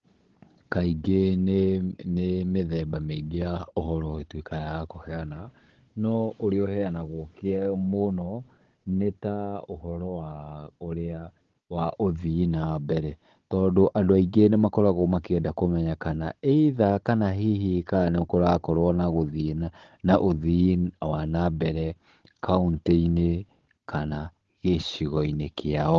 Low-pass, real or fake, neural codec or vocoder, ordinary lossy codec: 7.2 kHz; fake; codec, 16 kHz, 16 kbps, FunCodec, trained on Chinese and English, 50 frames a second; Opus, 16 kbps